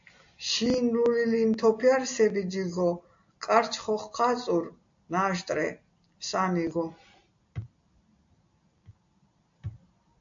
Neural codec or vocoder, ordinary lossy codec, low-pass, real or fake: none; AAC, 64 kbps; 7.2 kHz; real